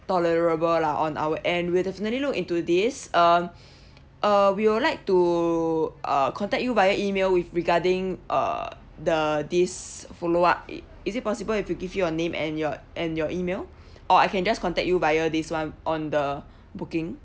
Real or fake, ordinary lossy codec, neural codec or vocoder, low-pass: real; none; none; none